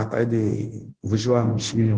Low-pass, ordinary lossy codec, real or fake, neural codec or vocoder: 9.9 kHz; Opus, 16 kbps; fake; codec, 24 kHz, 0.9 kbps, DualCodec